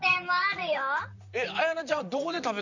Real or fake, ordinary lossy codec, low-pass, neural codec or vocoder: fake; none; 7.2 kHz; vocoder, 44.1 kHz, 128 mel bands, Pupu-Vocoder